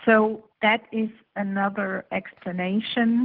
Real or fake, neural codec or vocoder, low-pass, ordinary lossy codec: real; none; 5.4 kHz; Opus, 16 kbps